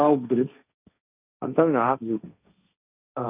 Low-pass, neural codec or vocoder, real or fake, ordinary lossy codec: 3.6 kHz; codec, 16 kHz, 1.1 kbps, Voila-Tokenizer; fake; none